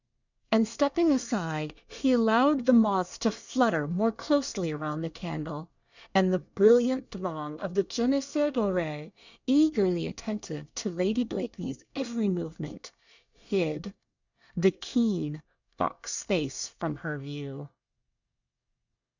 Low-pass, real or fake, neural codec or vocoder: 7.2 kHz; fake; codec, 24 kHz, 1 kbps, SNAC